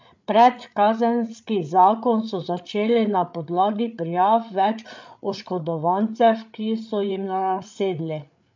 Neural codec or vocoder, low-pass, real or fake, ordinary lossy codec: codec, 16 kHz, 8 kbps, FreqCodec, larger model; 7.2 kHz; fake; MP3, 64 kbps